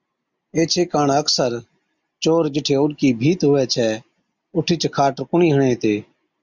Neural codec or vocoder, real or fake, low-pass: none; real; 7.2 kHz